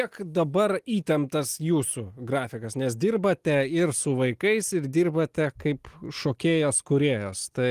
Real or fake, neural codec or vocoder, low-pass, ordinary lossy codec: real; none; 14.4 kHz; Opus, 24 kbps